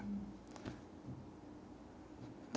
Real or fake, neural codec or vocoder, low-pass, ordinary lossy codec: real; none; none; none